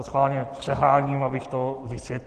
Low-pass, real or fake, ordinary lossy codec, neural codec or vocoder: 10.8 kHz; real; Opus, 16 kbps; none